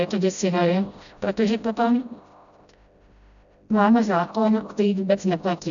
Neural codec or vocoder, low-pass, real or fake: codec, 16 kHz, 0.5 kbps, FreqCodec, smaller model; 7.2 kHz; fake